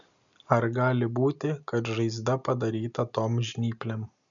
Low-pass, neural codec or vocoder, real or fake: 7.2 kHz; none; real